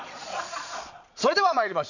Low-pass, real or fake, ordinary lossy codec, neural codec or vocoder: 7.2 kHz; fake; none; vocoder, 22.05 kHz, 80 mel bands, Vocos